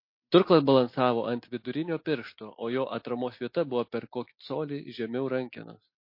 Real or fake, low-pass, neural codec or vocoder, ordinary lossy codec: real; 5.4 kHz; none; MP3, 32 kbps